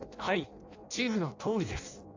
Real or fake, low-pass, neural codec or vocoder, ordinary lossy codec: fake; 7.2 kHz; codec, 16 kHz in and 24 kHz out, 0.6 kbps, FireRedTTS-2 codec; AAC, 48 kbps